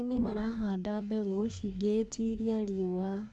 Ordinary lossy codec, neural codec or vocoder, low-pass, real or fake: none; codec, 24 kHz, 1 kbps, SNAC; 10.8 kHz; fake